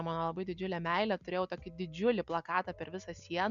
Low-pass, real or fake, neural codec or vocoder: 7.2 kHz; real; none